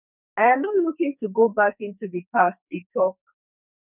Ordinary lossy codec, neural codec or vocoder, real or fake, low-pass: none; codec, 44.1 kHz, 2.6 kbps, SNAC; fake; 3.6 kHz